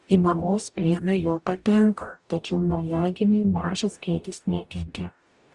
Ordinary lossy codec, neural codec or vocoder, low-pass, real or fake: Opus, 64 kbps; codec, 44.1 kHz, 0.9 kbps, DAC; 10.8 kHz; fake